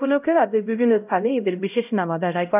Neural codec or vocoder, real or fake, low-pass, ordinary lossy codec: codec, 16 kHz, 0.5 kbps, X-Codec, HuBERT features, trained on LibriSpeech; fake; 3.6 kHz; none